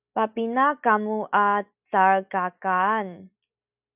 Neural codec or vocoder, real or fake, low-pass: none; real; 3.6 kHz